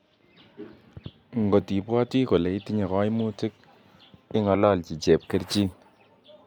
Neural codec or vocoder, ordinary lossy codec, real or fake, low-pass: none; none; real; 19.8 kHz